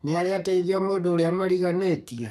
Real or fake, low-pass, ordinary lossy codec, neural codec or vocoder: fake; 14.4 kHz; none; codec, 32 kHz, 1.9 kbps, SNAC